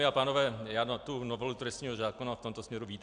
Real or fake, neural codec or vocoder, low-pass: real; none; 9.9 kHz